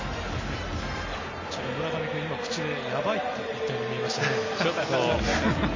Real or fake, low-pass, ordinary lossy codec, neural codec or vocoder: real; 7.2 kHz; MP3, 32 kbps; none